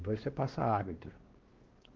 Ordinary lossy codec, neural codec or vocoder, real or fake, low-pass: Opus, 16 kbps; codec, 16 kHz, 2 kbps, X-Codec, WavLM features, trained on Multilingual LibriSpeech; fake; 7.2 kHz